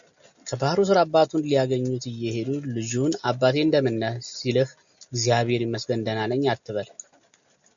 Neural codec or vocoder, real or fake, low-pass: none; real; 7.2 kHz